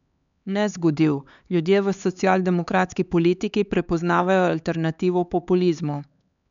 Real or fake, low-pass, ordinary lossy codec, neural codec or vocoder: fake; 7.2 kHz; none; codec, 16 kHz, 4 kbps, X-Codec, HuBERT features, trained on LibriSpeech